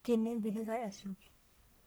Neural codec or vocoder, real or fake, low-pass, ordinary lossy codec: codec, 44.1 kHz, 1.7 kbps, Pupu-Codec; fake; none; none